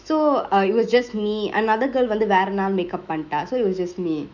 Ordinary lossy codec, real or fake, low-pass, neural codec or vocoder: none; fake; 7.2 kHz; autoencoder, 48 kHz, 128 numbers a frame, DAC-VAE, trained on Japanese speech